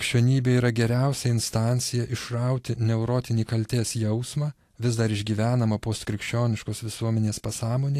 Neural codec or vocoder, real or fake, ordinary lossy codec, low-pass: none; real; AAC, 64 kbps; 14.4 kHz